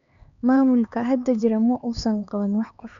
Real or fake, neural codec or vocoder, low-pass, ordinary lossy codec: fake; codec, 16 kHz, 2 kbps, X-Codec, HuBERT features, trained on LibriSpeech; 7.2 kHz; MP3, 64 kbps